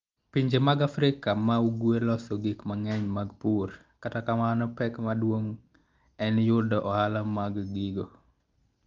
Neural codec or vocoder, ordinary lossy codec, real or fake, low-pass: none; Opus, 32 kbps; real; 7.2 kHz